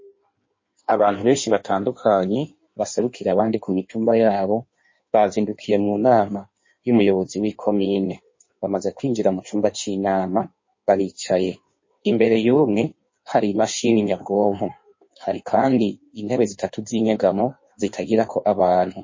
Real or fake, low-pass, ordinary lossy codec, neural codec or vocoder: fake; 7.2 kHz; MP3, 32 kbps; codec, 16 kHz in and 24 kHz out, 1.1 kbps, FireRedTTS-2 codec